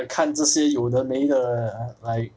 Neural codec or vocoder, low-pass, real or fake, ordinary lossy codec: none; none; real; none